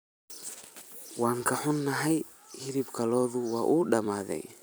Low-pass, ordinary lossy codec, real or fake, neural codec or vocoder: none; none; fake; vocoder, 44.1 kHz, 128 mel bands every 256 samples, BigVGAN v2